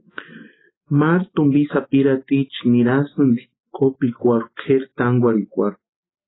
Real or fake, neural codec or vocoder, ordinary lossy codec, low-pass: real; none; AAC, 16 kbps; 7.2 kHz